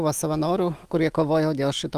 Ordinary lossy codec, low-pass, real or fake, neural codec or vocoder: Opus, 32 kbps; 14.4 kHz; fake; autoencoder, 48 kHz, 128 numbers a frame, DAC-VAE, trained on Japanese speech